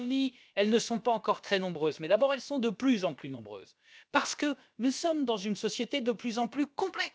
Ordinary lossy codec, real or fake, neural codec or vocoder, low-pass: none; fake; codec, 16 kHz, about 1 kbps, DyCAST, with the encoder's durations; none